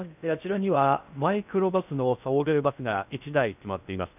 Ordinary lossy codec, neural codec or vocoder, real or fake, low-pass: none; codec, 16 kHz in and 24 kHz out, 0.6 kbps, FocalCodec, streaming, 2048 codes; fake; 3.6 kHz